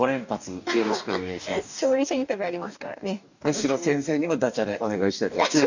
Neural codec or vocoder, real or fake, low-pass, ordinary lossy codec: codec, 44.1 kHz, 2.6 kbps, DAC; fake; 7.2 kHz; none